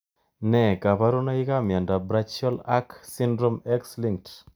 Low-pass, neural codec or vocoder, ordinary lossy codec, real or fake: none; none; none; real